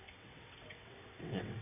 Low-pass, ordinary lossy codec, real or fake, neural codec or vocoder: 3.6 kHz; none; fake; vocoder, 22.05 kHz, 80 mel bands, WaveNeXt